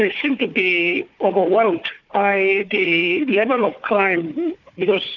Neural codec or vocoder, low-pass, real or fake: codec, 16 kHz, 4 kbps, FunCodec, trained on Chinese and English, 50 frames a second; 7.2 kHz; fake